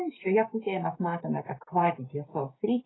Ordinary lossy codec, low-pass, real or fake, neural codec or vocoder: AAC, 16 kbps; 7.2 kHz; fake; codec, 16 kHz, 6 kbps, DAC